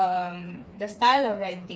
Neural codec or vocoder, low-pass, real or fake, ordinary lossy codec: codec, 16 kHz, 4 kbps, FreqCodec, smaller model; none; fake; none